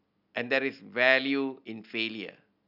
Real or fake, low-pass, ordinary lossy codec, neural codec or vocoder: real; 5.4 kHz; none; none